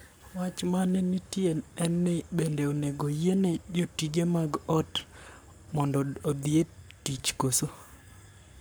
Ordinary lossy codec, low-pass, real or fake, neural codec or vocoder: none; none; fake; codec, 44.1 kHz, 7.8 kbps, Pupu-Codec